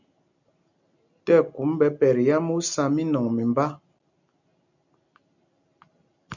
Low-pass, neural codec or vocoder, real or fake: 7.2 kHz; none; real